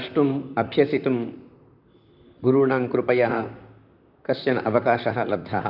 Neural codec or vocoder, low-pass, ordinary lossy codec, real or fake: vocoder, 44.1 kHz, 128 mel bands, Pupu-Vocoder; 5.4 kHz; none; fake